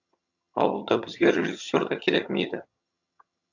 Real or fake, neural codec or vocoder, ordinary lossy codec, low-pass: fake; vocoder, 22.05 kHz, 80 mel bands, HiFi-GAN; MP3, 64 kbps; 7.2 kHz